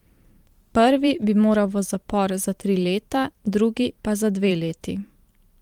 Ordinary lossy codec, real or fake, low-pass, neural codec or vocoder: Opus, 32 kbps; fake; 19.8 kHz; vocoder, 44.1 kHz, 128 mel bands every 512 samples, BigVGAN v2